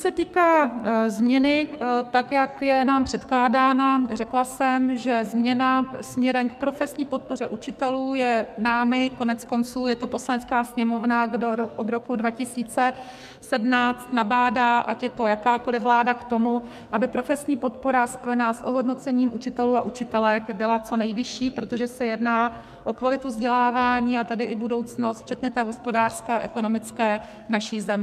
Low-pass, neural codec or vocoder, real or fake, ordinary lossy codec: 14.4 kHz; codec, 44.1 kHz, 2.6 kbps, SNAC; fake; MP3, 96 kbps